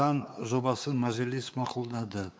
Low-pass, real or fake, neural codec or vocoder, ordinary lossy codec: none; fake; codec, 16 kHz, 4 kbps, X-Codec, WavLM features, trained on Multilingual LibriSpeech; none